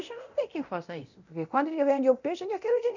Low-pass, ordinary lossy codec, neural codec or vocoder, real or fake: 7.2 kHz; none; codec, 24 kHz, 0.9 kbps, DualCodec; fake